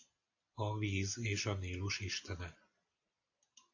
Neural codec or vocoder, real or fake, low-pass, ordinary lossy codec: none; real; 7.2 kHz; AAC, 48 kbps